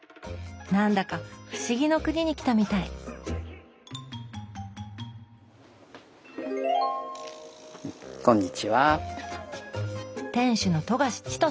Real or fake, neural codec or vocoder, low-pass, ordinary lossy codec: real; none; none; none